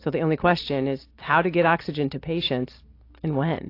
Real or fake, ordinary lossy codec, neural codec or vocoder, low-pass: real; AAC, 32 kbps; none; 5.4 kHz